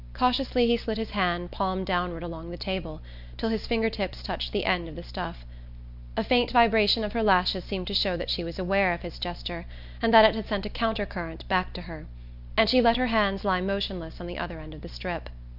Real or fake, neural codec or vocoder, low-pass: real; none; 5.4 kHz